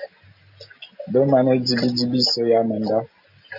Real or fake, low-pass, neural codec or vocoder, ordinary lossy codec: real; 5.4 kHz; none; AAC, 48 kbps